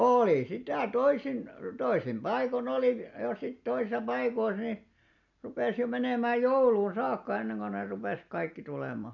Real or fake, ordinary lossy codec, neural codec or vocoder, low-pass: real; none; none; 7.2 kHz